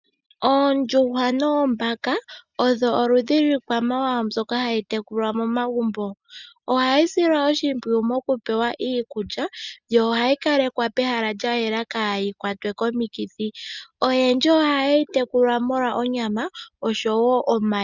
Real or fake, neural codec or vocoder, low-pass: real; none; 7.2 kHz